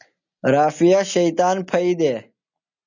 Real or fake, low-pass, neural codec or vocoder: real; 7.2 kHz; none